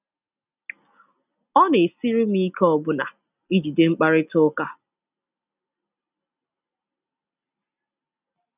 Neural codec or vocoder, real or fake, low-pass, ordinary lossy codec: none; real; 3.6 kHz; none